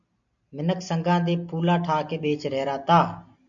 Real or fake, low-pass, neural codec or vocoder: real; 7.2 kHz; none